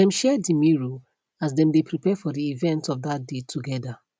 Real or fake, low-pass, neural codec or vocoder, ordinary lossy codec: real; none; none; none